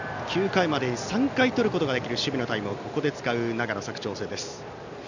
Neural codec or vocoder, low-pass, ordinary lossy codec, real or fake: none; 7.2 kHz; none; real